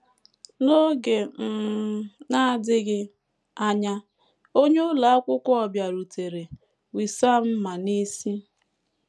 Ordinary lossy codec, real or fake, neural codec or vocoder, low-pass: none; real; none; none